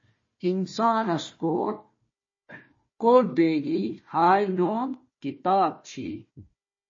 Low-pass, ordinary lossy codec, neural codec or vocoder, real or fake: 7.2 kHz; MP3, 32 kbps; codec, 16 kHz, 1 kbps, FunCodec, trained on Chinese and English, 50 frames a second; fake